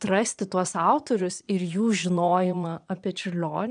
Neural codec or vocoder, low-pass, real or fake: vocoder, 22.05 kHz, 80 mel bands, Vocos; 9.9 kHz; fake